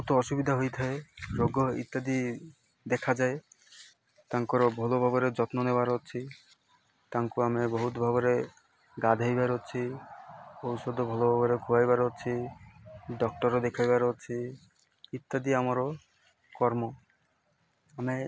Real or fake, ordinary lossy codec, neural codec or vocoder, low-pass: real; none; none; none